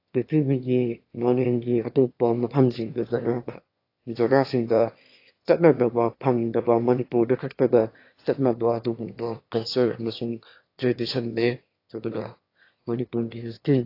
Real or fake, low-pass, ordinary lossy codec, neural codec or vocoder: fake; 5.4 kHz; AAC, 32 kbps; autoencoder, 22.05 kHz, a latent of 192 numbers a frame, VITS, trained on one speaker